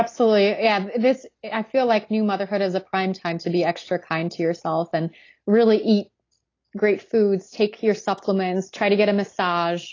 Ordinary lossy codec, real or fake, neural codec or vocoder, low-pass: AAC, 32 kbps; real; none; 7.2 kHz